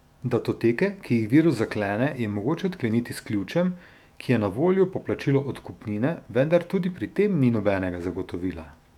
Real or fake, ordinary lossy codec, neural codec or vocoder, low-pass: fake; none; autoencoder, 48 kHz, 128 numbers a frame, DAC-VAE, trained on Japanese speech; 19.8 kHz